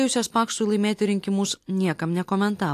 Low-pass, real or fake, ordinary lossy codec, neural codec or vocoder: 14.4 kHz; real; AAC, 64 kbps; none